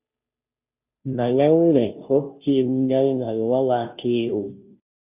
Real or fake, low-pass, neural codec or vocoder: fake; 3.6 kHz; codec, 16 kHz, 0.5 kbps, FunCodec, trained on Chinese and English, 25 frames a second